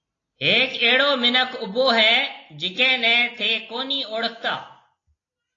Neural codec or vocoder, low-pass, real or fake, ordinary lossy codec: none; 7.2 kHz; real; AAC, 32 kbps